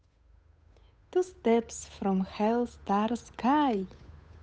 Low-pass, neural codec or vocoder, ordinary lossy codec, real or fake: none; codec, 16 kHz, 8 kbps, FunCodec, trained on Chinese and English, 25 frames a second; none; fake